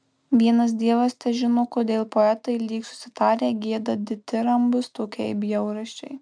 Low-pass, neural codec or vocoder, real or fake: 9.9 kHz; none; real